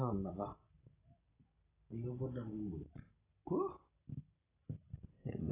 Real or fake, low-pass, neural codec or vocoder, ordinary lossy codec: real; 3.6 kHz; none; AAC, 16 kbps